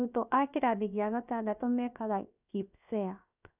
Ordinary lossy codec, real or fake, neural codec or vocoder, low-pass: none; fake; codec, 16 kHz, 0.7 kbps, FocalCodec; 3.6 kHz